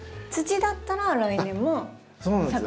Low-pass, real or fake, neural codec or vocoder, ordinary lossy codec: none; real; none; none